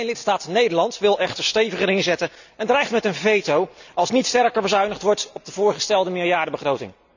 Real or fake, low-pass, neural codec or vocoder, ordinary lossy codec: real; 7.2 kHz; none; none